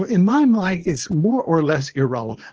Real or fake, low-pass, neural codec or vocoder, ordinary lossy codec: fake; 7.2 kHz; codec, 24 kHz, 0.9 kbps, WavTokenizer, small release; Opus, 16 kbps